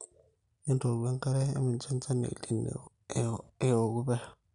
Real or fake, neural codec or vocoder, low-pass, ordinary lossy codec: real; none; 10.8 kHz; none